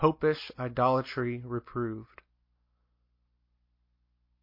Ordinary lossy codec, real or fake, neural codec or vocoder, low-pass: MP3, 32 kbps; real; none; 5.4 kHz